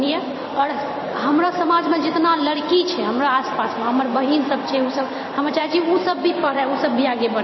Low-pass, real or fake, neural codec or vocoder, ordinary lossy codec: 7.2 kHz; fake; vocoder, 44.1 kHz, 128 mel bands every 256 samples, BigVGAN v2; MP3, 24 kbps